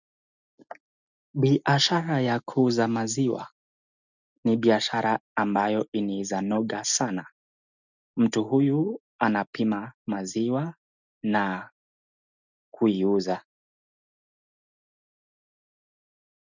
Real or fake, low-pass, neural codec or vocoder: real; 7.2 kHz; none